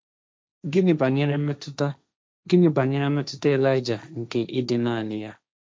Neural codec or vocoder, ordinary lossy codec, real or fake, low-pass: codec, 16 kHz, 1.1 kbps, Voila-Tokenizer; none; fake; none